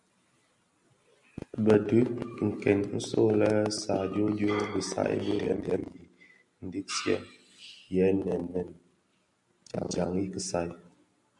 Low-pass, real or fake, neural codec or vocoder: 10.8 kHz; real; none